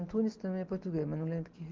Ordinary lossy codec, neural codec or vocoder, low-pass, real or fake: Opus, 32 kbps; none; 7.2 kHz; real